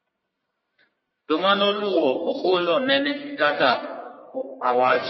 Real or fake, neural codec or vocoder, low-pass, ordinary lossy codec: fake; codec, 44.1 kHz, 1.7 kbps, Pupu-Codec; 7.2 kHz; MP3, 24 kbps